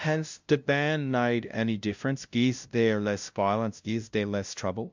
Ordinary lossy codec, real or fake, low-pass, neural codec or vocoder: MP3, 64 kbps; fake; 7.2 kHz; codec, 16 kHz, 0.5 kbps, FunCodec, trained on LibriTTS, 25 frames a second